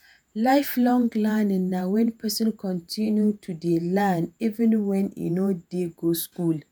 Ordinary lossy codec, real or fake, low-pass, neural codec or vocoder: none; fake; none; vocoder, 48 kHz, 128 mel bands, Vocos